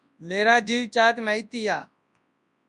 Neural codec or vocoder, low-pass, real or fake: codec, 24 kHz, 0.9 kbps, WavTokenizer, large speech release; 10.8 kHz; fake